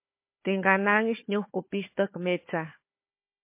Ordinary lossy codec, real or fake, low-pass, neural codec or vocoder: MP3, 24 kbps; fake; 3.6 kHz; codec, 16 kHz, 4 kbps, FunCodec, trained on Chinese and English, 50 frames a second